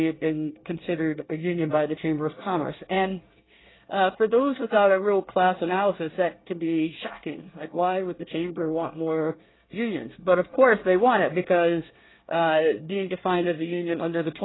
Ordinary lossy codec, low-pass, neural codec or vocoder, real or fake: AAC, 16 kbps; 7.2 kHz; codec, 24 kHz, 1 kbps, SNAC; fake